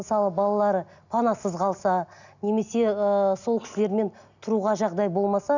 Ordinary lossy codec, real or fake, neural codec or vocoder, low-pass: none; real; none; 7.2 kHz